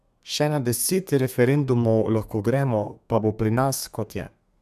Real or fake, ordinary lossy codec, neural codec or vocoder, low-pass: fake; none; codec, 32 kHz, 1.9 kbps, SNAC; 14.4 kHz